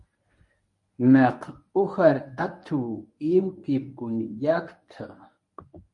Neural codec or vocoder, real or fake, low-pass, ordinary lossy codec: codec, 24 kHz, 0.9 kbps, WavTokenizer, medium speech release version 1; fake; 10.8 kHz; MP3, 48 kbps